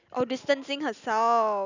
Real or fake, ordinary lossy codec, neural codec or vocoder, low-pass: real; none; none; 7.2 kHz